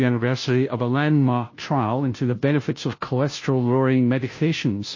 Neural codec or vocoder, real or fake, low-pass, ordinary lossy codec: codec, 16 kHz, 0.5 kbps, FunCodec, trained on Chinese and English, 25 frames a second; fake; 7.2 kHz; MP3, 32 kbps